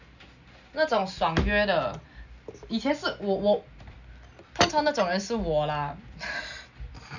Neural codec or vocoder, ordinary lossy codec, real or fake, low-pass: none; none; real; 7.2 kHz